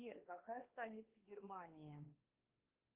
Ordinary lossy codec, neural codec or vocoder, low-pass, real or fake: Opus, 24 kbps; codec, 16 kHz, 2 kbps, X-Codec, WavLM features, trained on Multilingual LibriSpeech; 3.6 kHz; fake